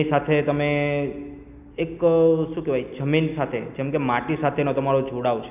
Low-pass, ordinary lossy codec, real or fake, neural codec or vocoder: 3.6 kHz; none; real; none